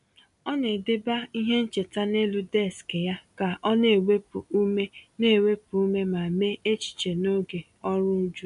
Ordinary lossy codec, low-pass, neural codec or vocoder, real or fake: MP3, 96 kbps; 10.8 kHz; none; real